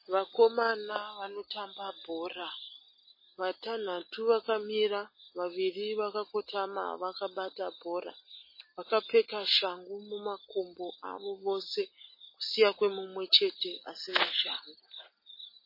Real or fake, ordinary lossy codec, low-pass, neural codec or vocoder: real; MP3, 24 kbps; 5.4 kHz; none